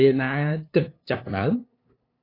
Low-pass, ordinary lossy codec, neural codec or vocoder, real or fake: 5.4 kHz; AAC, 24 kbps; codec, 16 kHz, 2 kbps, FunCodec, trained on LibriTTS, 25 frames a second; fake